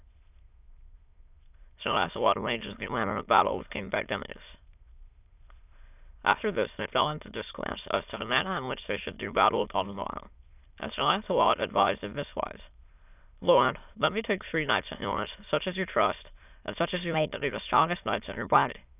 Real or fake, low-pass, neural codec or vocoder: fake; 3.6 kHz; autoencoder, 22.05 kHz, a latent of 192 numbers a frame, VITS, trained on many speakers